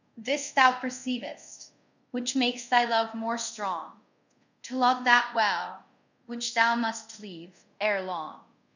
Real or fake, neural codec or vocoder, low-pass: fake; codec, 24 kHz, 0.5 kbps, DualCodec; 7.2 kHz